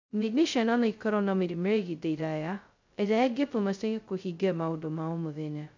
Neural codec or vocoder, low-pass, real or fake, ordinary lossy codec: codec, 16 kHz, 0.2 kbps, FocalCodec; 7.2 kHz; fake; MP3, 64 kbps